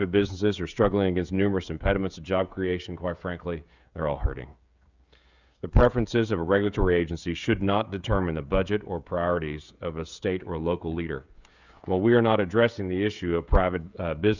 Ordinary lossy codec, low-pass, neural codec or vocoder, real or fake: Opus, 64 kbps; 7.2 kHz; codec, 16 kHz, 16 kbps, FreqCodec, smaller model; fake